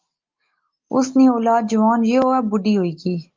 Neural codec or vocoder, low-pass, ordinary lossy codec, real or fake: none; 7.2 kHz; Opus, 32 kbps; real